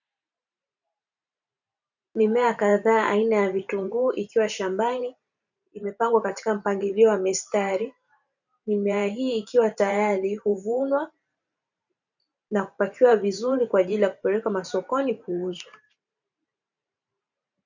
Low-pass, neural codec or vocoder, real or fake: 7.2 kHz; vocoder, 44.1 kHz, 128 mel bands every 512 samples, BigVGAN v2; fake